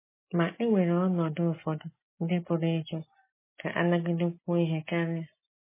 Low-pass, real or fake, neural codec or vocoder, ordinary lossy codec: 3.6 kHz; real; none; MP3, 16 kbps